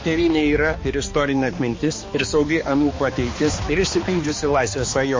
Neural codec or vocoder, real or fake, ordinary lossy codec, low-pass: codec, 16 kHz, 2 kbps, X-Codec, HuBERT features, trained on balanced general audio; fake; MP3, 32 kbps; 7.2 kHz